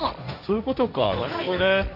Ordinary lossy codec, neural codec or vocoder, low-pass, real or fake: AAC, 48 kbps; codec, 16 kHz, 1.1 kbps, Voila-Tokenizer; 5.4 kHz; fake